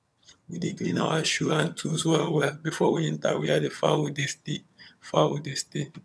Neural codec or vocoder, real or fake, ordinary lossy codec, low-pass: vocoder, 22.05 kHz, 80 mel bands, HiFi-GAN; fake; none; none